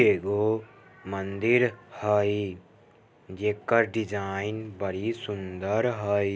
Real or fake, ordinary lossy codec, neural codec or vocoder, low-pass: real; none; none; none